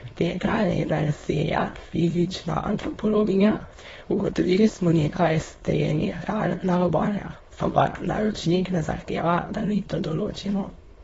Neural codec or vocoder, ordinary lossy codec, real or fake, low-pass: autoencoder, 22.05 kHz, a latent of 192 numbers a frame, VITS, trained on many speakers; AAC, 24 kbps; fake; 9.9 kHz